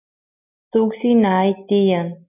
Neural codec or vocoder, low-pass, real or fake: none; 3.6 kHz; real